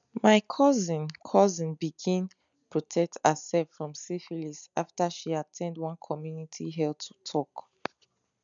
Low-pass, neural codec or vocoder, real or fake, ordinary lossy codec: 7.2 kHz; none; real; none